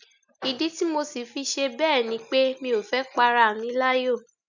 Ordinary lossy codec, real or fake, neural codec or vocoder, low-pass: none; real; none; 7.2 kHz